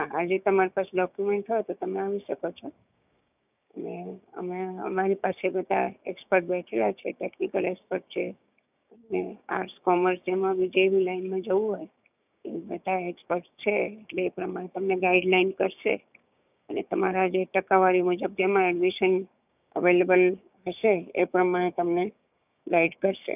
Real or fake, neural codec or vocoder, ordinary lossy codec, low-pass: fake; codec, 16 kHz, 6 kbps, DAC; none; 3.6 kHz